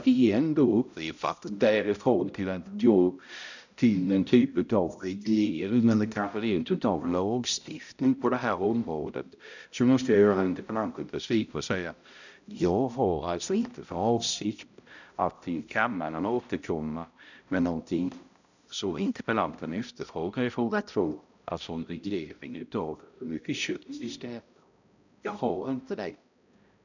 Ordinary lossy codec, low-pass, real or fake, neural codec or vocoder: none; 7.2 kHz; fake; codec, 16 kHz, 0.5 kbps, X-Codec, HuBERT features, trained on balanced general audio